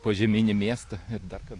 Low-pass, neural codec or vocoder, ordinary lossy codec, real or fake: 10.8 kHz; none; AAC, 48 kbps; real